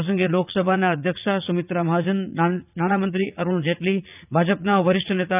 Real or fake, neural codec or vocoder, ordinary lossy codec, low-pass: fake; vocoder, 44.1 kHz, 80 mel bands, Vocos; none; 3.6 kHz